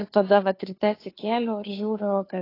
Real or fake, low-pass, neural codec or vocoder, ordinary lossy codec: fake; 5.4 kHz; codec, 16 kHz, 2 kbps, FunCodec, trained on Chinese and English, 25 frames a second; AAC, 24 kbps